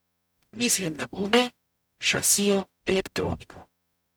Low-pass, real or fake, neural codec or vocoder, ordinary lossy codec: none; fake; codec, 44.1 kHz, 0.9 kbps, DAC; none